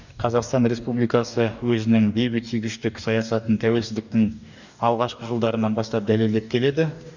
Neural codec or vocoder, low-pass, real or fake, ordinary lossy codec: codec, 44.1 kHz, 2.6 kbps, DAC; 7.2 kHz; fake; none